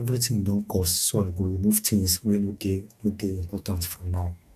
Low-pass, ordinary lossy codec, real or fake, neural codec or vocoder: 14.4 kHz; none; fake; codec, 44.1 kHz, 2.6 kbps, DAC